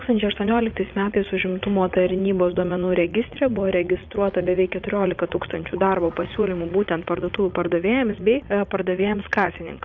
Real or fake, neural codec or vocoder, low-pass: fake; vocoder, 44.1 kHz, 80 mel bands, Vocos; 7.2 kHz